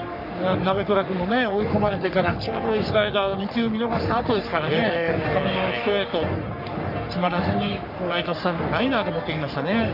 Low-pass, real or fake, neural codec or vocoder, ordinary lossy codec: 5.4 kHz; fake; codec, 44.1 kHz, 3.4 kbps, Pupu-Codec; none